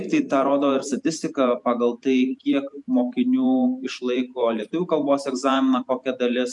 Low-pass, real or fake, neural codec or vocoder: 10.8 kHz; fake; vocoder, 44.1 kHz, 128 mel bands every 512 samples, BigVGAN v2